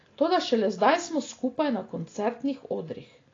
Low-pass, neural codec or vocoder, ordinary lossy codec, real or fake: 7.2 kHz; none; AAC, 32 kbps; real